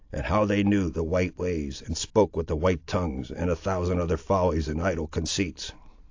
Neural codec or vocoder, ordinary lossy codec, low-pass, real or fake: none; AAC, 48 kbps; 7.2 kHz; real